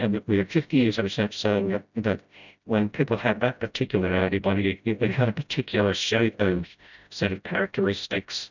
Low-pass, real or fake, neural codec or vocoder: 7.2 kHz; fake; codec, 16 kHz, 0.5 kbps, FreqCodec, smaller model